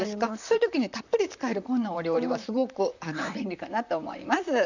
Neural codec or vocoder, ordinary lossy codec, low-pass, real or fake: vocoder, 44.1 kHz, 128 mel bands, Pupu-Vocoder; none; 7.2 kHz; fake